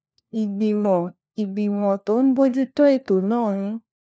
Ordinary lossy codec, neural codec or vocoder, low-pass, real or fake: none; codec, 16 kHz, 1 kbps, FunCodec, trained on LibriTTS, 50 frames a second; none; fake